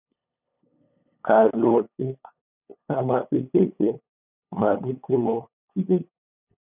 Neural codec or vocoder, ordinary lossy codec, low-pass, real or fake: codec, 16 kHz, 8 kbps, FunCodec, trained on LibriTTS, 25 frames a second; none; 3.6 kHz; fake